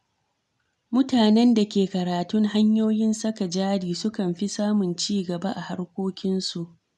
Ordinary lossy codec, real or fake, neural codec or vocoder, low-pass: none; real; none; none